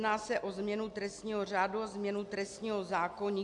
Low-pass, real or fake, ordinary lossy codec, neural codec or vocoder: 10.8 kHz; real; AAC, 64 kbps; none